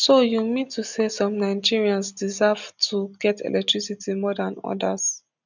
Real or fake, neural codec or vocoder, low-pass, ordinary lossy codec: real; none; 7.2 kHz; none